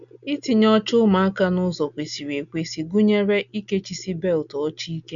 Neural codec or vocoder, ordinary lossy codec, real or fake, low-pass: none; none; real; 7.2 kHz